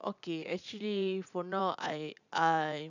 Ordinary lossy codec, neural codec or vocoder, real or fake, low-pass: none; vocoder, 44.1 kHz, 80 mel bands, Vocos; fake; 7.2 kHz